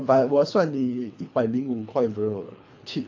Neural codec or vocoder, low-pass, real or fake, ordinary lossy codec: codec, 24 kHz, 3 kbps, HILCodec; 7.2 kHz; fake; AAC, 48 kbps